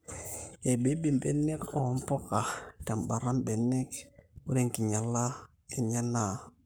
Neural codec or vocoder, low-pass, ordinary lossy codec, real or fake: codec, 44.1 kHz, 7.8 kbps, Pupu-Codec; none; none; fake